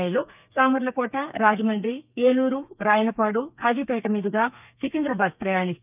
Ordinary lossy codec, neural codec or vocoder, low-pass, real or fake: none; codec, 32 kHz, 1.9 kbps, SNAC; 3.6 kHz; fake